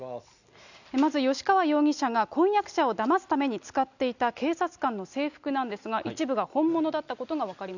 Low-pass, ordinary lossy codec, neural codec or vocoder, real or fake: 7.2 kHz; none; none; real